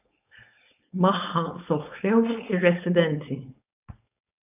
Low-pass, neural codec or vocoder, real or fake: 3.6 kHz; codec, 16 kHz, 4.8 kbps, FACodec; fake